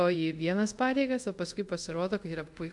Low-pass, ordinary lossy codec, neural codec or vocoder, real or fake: 10.8 kHz; MP3, 64 kbps; codec, 24 kHz, 0.5 kbps, DualCodec; fake